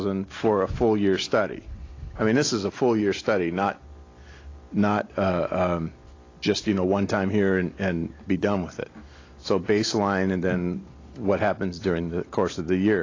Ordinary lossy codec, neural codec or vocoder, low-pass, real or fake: AAC, 32 kbps; none; 7.2 kHz; real